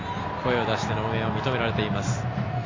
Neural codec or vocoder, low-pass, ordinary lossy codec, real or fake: none; 7.2 kHz; AAC, 32 kbps; real